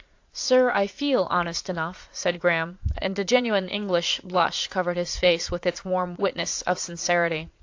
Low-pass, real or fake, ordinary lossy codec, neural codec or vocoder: 7.2 kHz; fake; AAC, 48 kbps; vocoder, 44.1 kHz, 80 mel bands, Vocos